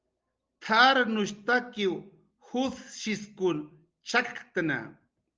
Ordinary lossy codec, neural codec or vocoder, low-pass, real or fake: Opus, 24 kbps; none; 7.2 kHz; real